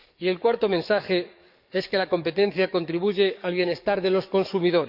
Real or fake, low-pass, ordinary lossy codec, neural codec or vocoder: fake; 5.4 kHz; none; codec, 44.1 kHz, 7.8 kbps, DAC